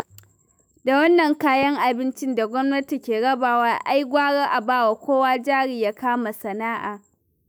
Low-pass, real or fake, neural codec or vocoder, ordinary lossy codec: none; fake; autoencoder, 48 kHz, 128 numbers a frame, DAC-VAE, trained on Japanese speech; none